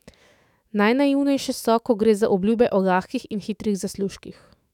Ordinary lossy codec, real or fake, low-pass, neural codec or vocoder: none; fake; 19.8 kHz; autoencoder, 48 kHz, 128 numbers a frame, DAC-VAE, trained on Japanese speech